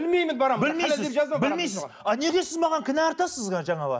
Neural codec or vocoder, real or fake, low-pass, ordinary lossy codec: none; real; none; none